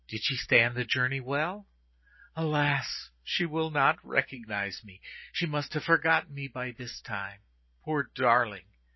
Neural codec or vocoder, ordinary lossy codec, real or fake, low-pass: none; MP3, 24 kbps; real; 7.2 kHz